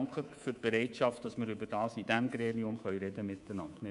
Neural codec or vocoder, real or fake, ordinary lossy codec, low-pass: codec, 24 kHz, 3.1 kbps, DualCodec; fake; none; 10.8 kHz